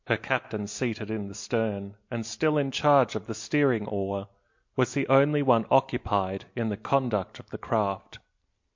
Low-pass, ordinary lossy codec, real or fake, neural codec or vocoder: 7.2 kHz; MP3, 48 kbps; real; none